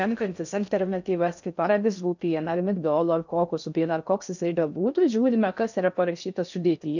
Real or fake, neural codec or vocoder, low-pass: fake; codec, 16 kHz in and 24 kHz out, 0.6 kbps, FocalCodec, streaming, 2048 codes; 7.2 kHz